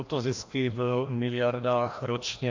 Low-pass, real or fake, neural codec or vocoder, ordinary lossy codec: 7.2 kHz; fake; codec, 16 kHz, 1 kbps, FreqCodec, larger model; AAC, 48 kbps